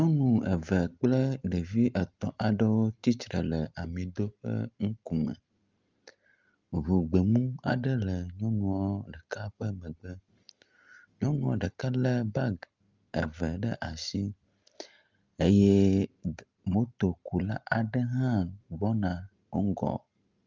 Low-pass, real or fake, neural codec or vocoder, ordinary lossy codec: 7.2 kHz; real; none; Opus, 32 kbps